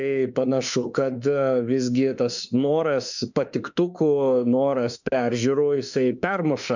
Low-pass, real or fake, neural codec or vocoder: 7.2 kHz; fake; autoencoder, 48 kHz, 32 numbers a frame, DAC-VAE, trained on Japanese speech